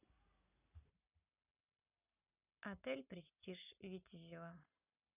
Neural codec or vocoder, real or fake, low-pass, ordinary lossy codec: none; real; 3.6 kHz; none